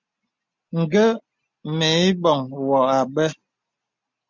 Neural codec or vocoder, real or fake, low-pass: none; real; 7.2 kHz